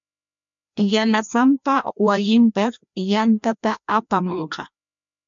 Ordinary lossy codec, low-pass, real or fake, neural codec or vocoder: MP3, 64 kbps; 7.2 kHz; fake; codec, 16 kHz, 1 kbps, FreqCodec, larger model